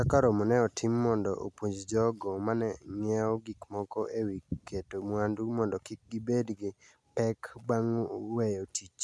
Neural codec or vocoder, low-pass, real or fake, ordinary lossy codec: none; none; real; none